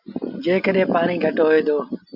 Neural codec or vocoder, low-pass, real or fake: none; 5.4 kHz; real